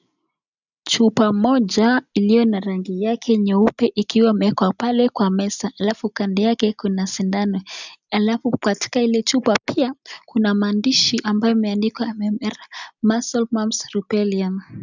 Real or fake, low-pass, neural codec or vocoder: real; 7.2 kHz; none